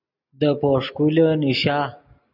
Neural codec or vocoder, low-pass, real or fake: none; 5.4 kHz; real